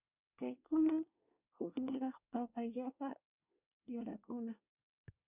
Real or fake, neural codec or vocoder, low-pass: fake; codec, 24 kHz, 1 kbps, SNAC; 3.6 kHz